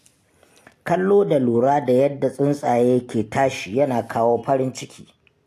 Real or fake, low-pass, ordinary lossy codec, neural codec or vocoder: real; 14.4 kHz; AAC, 64 kbps; none